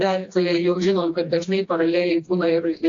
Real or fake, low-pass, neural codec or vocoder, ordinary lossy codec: fake; 7.2 kHz; codec, 16 kHz, 1 kbps, FreqCodec, smaller model; AAC, 48 kbps